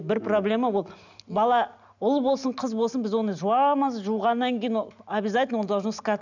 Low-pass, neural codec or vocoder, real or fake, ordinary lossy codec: 7.2 kHz; none; real; none